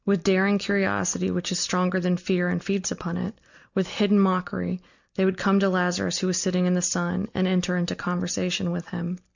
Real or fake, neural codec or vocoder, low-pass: real; none; 7.2 kHz